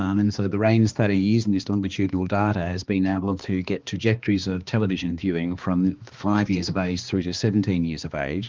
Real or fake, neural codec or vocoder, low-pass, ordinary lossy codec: fake; codec, 16 kHz, 2 kbps, X-Codec, HuBERT features, trained on balanced general audio; 7.2 kHz; Opus, 32 kbps